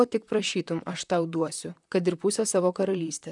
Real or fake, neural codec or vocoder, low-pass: fake; vocoder, 44.1 kHz, 128 mel bands, Pupu-Vocoder; 10.8 kHz